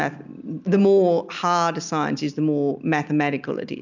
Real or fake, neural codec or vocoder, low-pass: real; none; 7.2 kHz